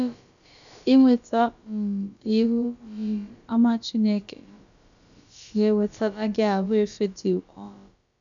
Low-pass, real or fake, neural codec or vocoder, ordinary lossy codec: 7.2 kHz; fake; codec, 16 kHz, about 1 kbps, DyCAST, with the encoder's durations; none